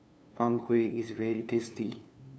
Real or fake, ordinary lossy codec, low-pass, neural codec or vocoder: fake; none; none; codec, 16 kHz, 2 kbps, FunCodec, trained on LibriTTS, 25 frames a second